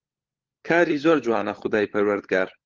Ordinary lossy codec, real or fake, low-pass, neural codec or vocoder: Opus, 16 kbps; fake; 7.2 kHz; codec, 16 kHz, 16 kbps, FunCodec, trained on LibriTTS, 50 frames a second